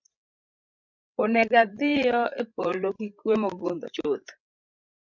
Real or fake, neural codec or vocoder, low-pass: fake; codec, 16 kHz, 16 kbps, FreqCodec, larger model; 7.2 kHz